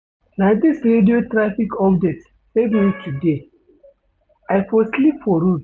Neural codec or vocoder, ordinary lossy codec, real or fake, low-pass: none; none; real; none